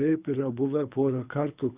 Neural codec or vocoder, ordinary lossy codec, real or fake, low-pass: codec, 24 kHz, 3 kbps, HILCodec; Opus, 64 kbps; fake; 3.6 kHz